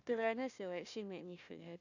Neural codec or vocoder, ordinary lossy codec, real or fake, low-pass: codec, 16 kHz, 1 kbps, FunCodec, trained on Chinese and English, 50 frames a second; none; fake; 7.2 kHz